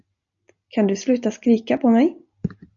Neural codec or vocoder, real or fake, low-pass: none; real; 7.2 kHz